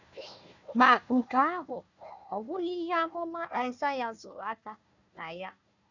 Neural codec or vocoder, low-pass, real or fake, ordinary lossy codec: codec, 16 kHz, 1 kbps, FunCodec, trained on Chinese and English, 50 frames a second; 7.2 kHz; fake; none